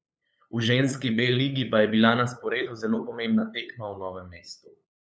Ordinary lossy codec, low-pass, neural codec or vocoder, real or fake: none; none; codec, 16 kHz, 8 kbps, FunCodec, trained on LibriTTS, 25 frames a second; fake